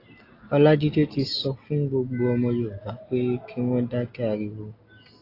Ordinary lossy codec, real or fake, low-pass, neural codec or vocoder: AAC, 32 kbps; real; 5.4 kHz; none